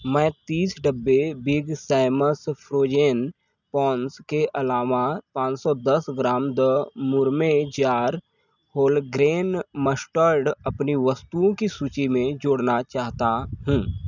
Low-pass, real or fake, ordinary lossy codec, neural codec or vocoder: 7.2 kHz; real; none; none